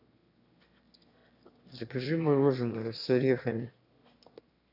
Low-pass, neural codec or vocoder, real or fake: 5.4 kHz; autoencoder, 22.05 kHz, a latent of 192 numbers a frame, VITS, trained on one speaker; fake